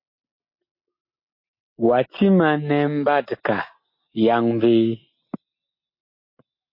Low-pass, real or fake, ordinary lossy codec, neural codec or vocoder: 5.4 kHz; real; MP3, 32 kbps; none